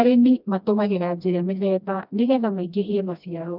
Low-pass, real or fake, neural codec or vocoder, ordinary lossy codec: 5.4 kHz; fake; codec, 16 kHz, 1 kbps, FreqCodec, smaller model; none